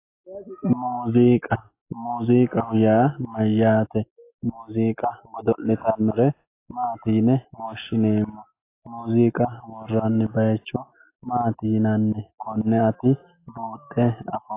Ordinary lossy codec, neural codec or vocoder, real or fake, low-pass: AAC, 24 kbps; none; real; 3.6 kHz